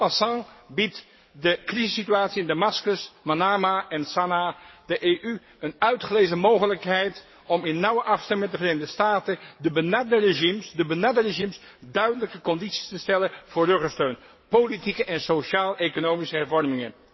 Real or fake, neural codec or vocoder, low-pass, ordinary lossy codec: fake; codec, 44.1 kHz, 7.8 kbps, DAC; 7.2 kHz; MP3, 24 kbps